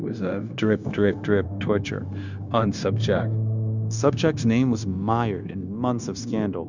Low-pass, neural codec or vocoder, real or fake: 7.2 kHz; codec, 16 kHz, 0.9 kbps, LongCat-Audio-Codec; fake